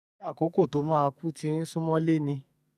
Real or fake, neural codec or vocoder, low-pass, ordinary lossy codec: fake; codec, 44.1 kHz, 2.6 kbps, SNAC; 14.4 kHz; none